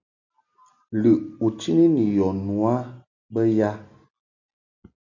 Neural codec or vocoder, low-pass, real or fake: none; 7.2 kHz; real